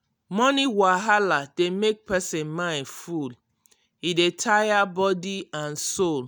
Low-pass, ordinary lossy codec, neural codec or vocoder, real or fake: none; none; none; real